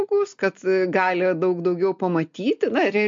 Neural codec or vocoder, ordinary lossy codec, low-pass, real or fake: none; MP3, 48 kbps; 7.2 kHz; real